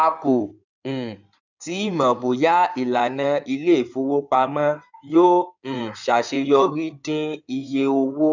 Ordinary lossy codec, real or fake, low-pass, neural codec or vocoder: none; fake; 7.2 kHz; codec, 16 kHz in and 24 kHz out, 2.2 kbps, FireRedTTS-2 codec